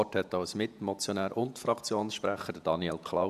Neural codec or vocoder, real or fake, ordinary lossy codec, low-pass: vocoder, 48 kHz, 128 mel bands, Vocos; fake; none; 14.4 kHz